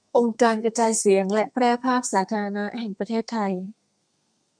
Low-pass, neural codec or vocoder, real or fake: 9.9 kHz; codec, 32 kHz, 1.9 kbps, SNAC; fake